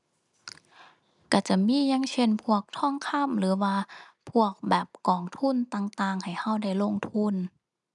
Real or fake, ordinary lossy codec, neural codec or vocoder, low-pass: real; none; none; 10.8 kHz